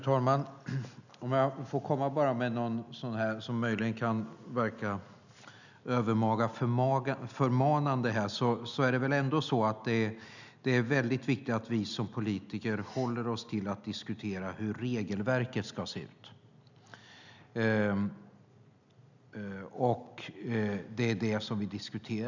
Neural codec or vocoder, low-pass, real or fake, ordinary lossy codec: none; 7.2 kHz; real; none